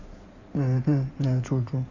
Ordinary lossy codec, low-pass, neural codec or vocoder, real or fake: none; 7.2 kHz; codec, 16 kHz in and 24 kHz out, 2.2 kbps, FireRedTTS-2 codec; fake